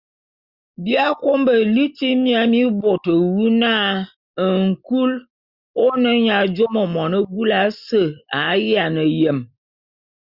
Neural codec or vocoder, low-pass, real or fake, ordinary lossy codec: none; 5.4 kHz; real; Opus, 64 kbps